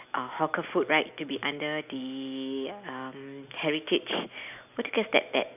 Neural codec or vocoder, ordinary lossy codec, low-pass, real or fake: none; none; 3.6 kHz; real